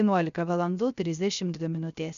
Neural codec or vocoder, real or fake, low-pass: codec, 16 kHz, 0.3 kbps, FocalCodec; fake; 7.2 kHz